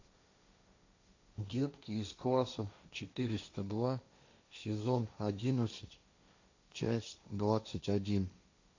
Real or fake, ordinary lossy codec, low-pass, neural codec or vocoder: fake; AAC, 48 kbps; 7.2 kHz; codec, 16 kHz, 1.1 kbps, Voila-Tokenizer